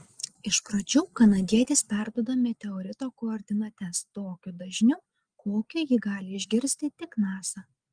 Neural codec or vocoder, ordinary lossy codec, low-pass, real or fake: none; Opus, 24 kbps; 9.9 kHz; real